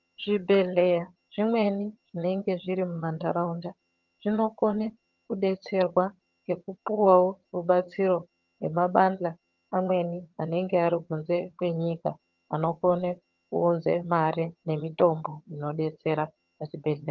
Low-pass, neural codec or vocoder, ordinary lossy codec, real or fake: 7.2 kHz; vocoder, 22.05 kHz, 80 mel bands, HiFi-GAN; Opus, 32 kbps; fake